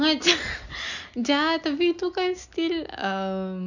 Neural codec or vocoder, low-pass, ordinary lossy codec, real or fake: none; 7.2 kHz; none; real